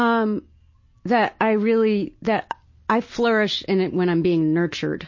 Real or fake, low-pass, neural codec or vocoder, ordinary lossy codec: real; 7.2 kHz; none; MP3, 32 kbps